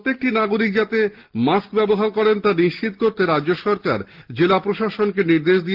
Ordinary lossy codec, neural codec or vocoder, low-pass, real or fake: Opus, 16 kbps; none; 5.4 kHz; real